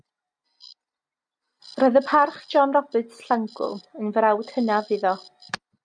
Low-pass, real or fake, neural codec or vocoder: 9.9 kHz; real; none